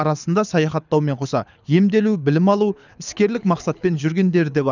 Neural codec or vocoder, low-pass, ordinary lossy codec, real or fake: codec, 24 kHz, 6 kbps, HILCodec; 7.2 kHz; none; fake